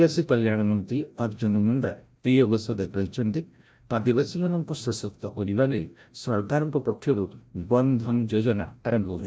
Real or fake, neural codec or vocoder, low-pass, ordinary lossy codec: fake; codec, 16 kHz, 0.5 kbps, FreqCodec, larger model; none; none